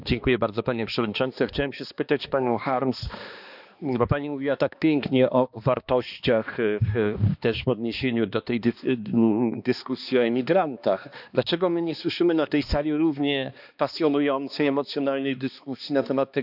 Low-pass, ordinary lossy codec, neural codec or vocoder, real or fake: 5.4 kHz; none; codec, 16 kHz, 2 kbps, X-Codec, HuBERT features, trained on balanced general audio; fake